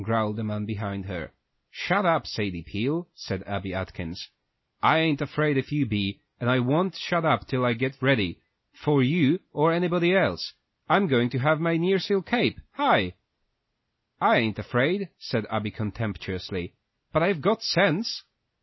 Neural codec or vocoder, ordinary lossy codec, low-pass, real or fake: none; MP3, 24 kbps; 7.2 kHz; real